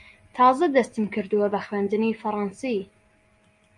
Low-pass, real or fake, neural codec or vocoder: 10.8 kHz; real; none